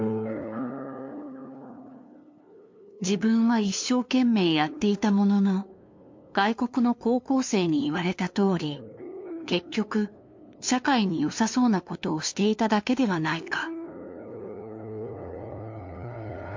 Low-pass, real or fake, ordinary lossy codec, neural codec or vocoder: 7.2 kHz; fake; MP3, 48 kbps; codec, 16 kHz, 2 kbps, FunCodec, trained on LibriTTS, 25 frames a second